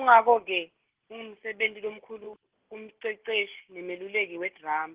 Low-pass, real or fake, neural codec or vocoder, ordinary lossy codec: 3.6 kHz; real; none; Opus, 16 kbps